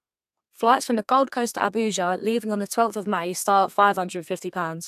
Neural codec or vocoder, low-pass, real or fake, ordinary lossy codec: codec, 32 kHz, 1.9 kbps, SNAC; 14.4 kHz; fake; AAC, 96 kbps